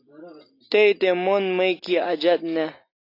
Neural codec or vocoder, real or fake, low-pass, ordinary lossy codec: none; real; 5.4 kHz; AAC, 32 kbps